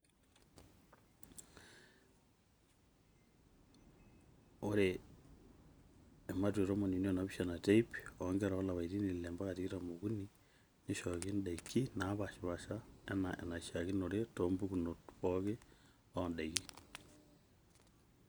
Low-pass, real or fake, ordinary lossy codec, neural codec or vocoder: none; real; none; none